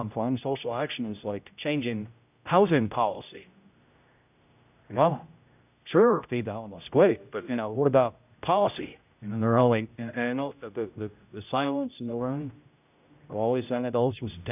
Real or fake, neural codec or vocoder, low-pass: fake; codec, 16 kHz, 0.5 kbps, X-Codec, HuBERT features, trained on general audio; 3.6 kHz